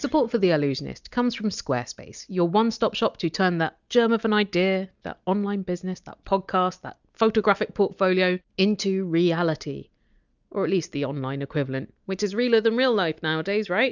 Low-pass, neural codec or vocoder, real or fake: 7.2 kHz; none; real